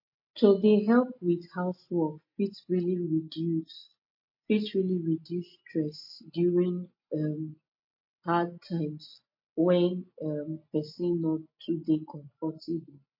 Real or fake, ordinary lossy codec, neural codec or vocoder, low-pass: real; MP3, 32 kbps; none; 5.4 kHz